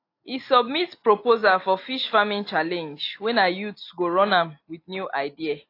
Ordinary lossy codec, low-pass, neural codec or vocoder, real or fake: AAC, 32 kbps; 5.4 kHz; none; real